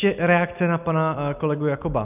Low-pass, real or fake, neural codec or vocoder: 3.6 kHz; real; none